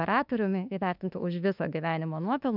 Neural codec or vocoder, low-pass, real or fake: autoencoder, 48 kHz, 32 numbers a frame, DAC-VAE, trained on Japanese speech; 5.4 kHz; fake